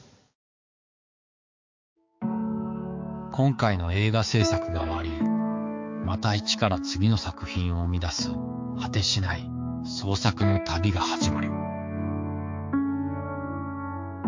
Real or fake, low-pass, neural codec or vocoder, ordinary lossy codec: fake; 7.2 kHz; codec, 16 kHz, 4 kbps, X-Codec, HuBERT features, trained on balanced general audio; MP3, 48 kbps